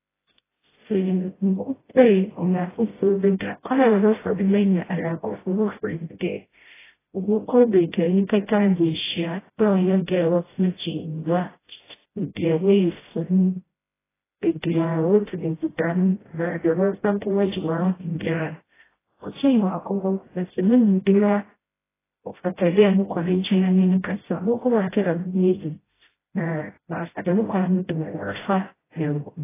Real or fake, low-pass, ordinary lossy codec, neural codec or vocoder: fake; 3.6 kHz; AAC, 16 kbps; codec, 16 kHz, 0.5 kbps, FreqCodec, smaller model